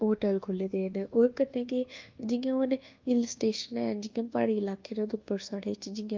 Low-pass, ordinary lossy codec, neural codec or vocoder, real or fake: 7.2 kHz; Opus, 24 kbps; codec, 24 kHz, 1.2 kbps, DualCodec; fake